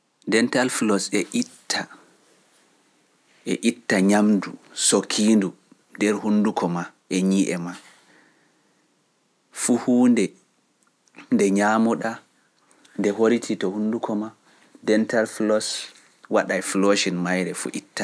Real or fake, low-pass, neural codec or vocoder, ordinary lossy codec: real; none; none; none